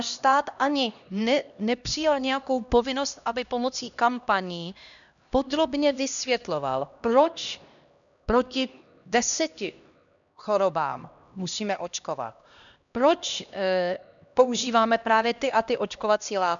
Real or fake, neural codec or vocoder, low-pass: fake; codec, 16 kHz, 1 kbps, X-Codec, HuBERT features, trained on LibriSpeech; 7.2 kHz